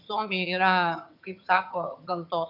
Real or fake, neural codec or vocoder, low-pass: fake; vocoder, 22.05 kHz, 80 mel bands, HiFi-GAN; 5.4 kHz